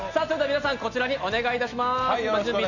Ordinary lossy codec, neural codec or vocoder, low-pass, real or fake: none; none; 7.2 kHz; real